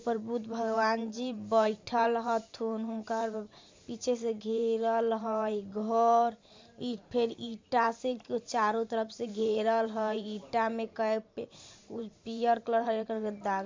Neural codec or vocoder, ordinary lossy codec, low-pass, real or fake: vocoder, 44.1 kHz, 128 mel bands every 512 samples, BigVGAN v2; MP3, 64 kbps; 7.2 kHz; fake